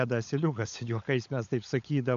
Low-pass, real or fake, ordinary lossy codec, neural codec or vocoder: 7.2 kHz; fake; MP3, 64 kbps; codec, 16 kHz, 16 kbps, FunCodec, trained on LibriTTS, 50 frames a second